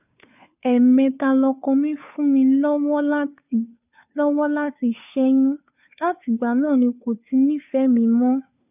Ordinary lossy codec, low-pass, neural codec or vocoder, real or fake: none; 3.6 kHz; codec, 16 kHz, 2 kbps, FunCodec, trained on Chinese and English, 25 frames a second; fake